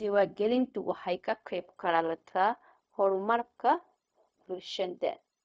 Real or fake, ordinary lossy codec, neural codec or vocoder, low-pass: fake; none; codec, 16 kHz, 0.4 kbps, LongCat-Audio-Codec; none